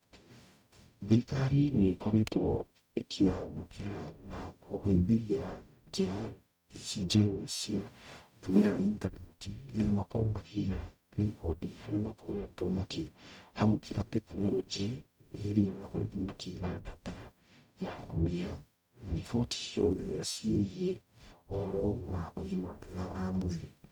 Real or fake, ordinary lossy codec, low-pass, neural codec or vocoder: fake; none; 19.8 kHz; codec, 44.1 kHz, 0.9 kbps, DAC